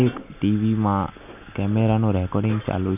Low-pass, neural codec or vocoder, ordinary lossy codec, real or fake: 3.6 kHz; none; none; real